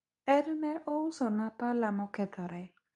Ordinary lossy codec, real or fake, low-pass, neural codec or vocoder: none; fake; 10.8 kHz; codec, 24 kHz, 0.9 kbps, WavTokenizer, medium speech release version 2